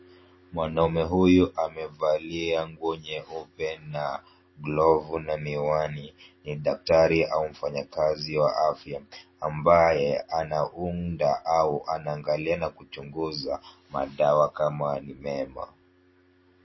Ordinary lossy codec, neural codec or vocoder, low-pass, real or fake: MP3, 24 kbps; none; 7.2 kHz; real